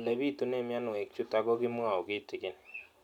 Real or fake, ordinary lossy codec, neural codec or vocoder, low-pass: real; none; none; 19.8 kHz